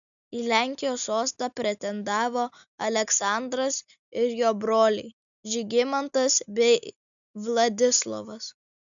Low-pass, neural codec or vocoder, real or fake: 7.2 kHz; none; real